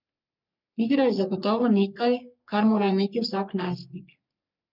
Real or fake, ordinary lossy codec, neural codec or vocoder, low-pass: fake; none; codec, 44.1 kHz, 3.4 kbps, Pupu-Codec; 5.4 kHz